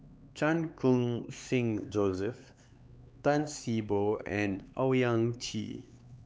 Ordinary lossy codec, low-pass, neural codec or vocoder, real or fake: none; none; codec, 16 kHz, 4 kbps, X-Codec, HuBERT features, trained on LibriSpeech; fake